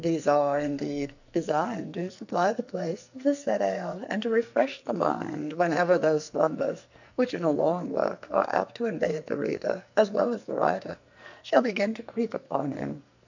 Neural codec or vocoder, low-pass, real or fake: codec, 44.1 kHz, 2.6 kbps, SNAC; 7.2 kHz; fake